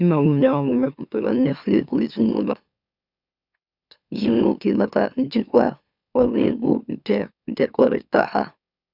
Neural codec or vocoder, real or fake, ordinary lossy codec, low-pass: autoencoder, 44.1 kHz, a latent of 192 numbers a frame, MeloTTS; fake; none; 5.4 kHz